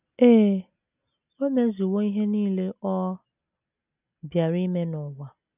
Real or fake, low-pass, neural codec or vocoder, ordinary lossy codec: real; 3.6 kHz; none; none